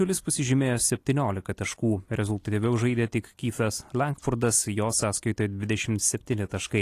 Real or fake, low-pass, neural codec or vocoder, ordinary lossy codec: real; 14.4 kHz; none; AAC, 48 kbps